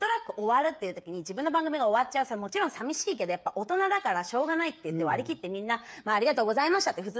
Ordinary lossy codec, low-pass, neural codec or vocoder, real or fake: none; none; codec, 16 kHz, 8 kbps, FreqCodec, smaller model; fake